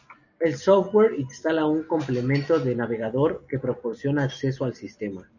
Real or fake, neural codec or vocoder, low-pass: real; none; 7.2 kHz